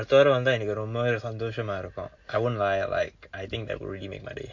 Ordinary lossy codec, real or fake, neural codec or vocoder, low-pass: MP3, 48 kbps; real; none; 7.2 kHz